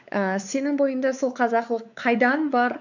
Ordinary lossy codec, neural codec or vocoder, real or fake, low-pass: none; codec, 16 kHz, 4 kbps, X-Codec, WavLM features, trained on Multilingual LibriSpeech; fake; 7.2 kHz